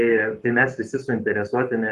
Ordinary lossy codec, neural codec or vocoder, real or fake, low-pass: Opus, 24 kbps; codec, 44.1 kHz, 7.8 kbps, Pupu-Codec; fake; 14.4 kHz